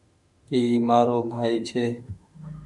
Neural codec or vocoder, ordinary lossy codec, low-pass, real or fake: autoencoder, 48 kHz, 32 numbers a frame, DAC-VAE, trained on Japanese speech; Opus, 64 kbps; 10.8 kHz; fake